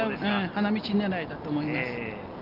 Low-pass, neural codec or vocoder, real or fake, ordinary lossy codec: 5.4 kHz; none; real; Opus, 32 kbps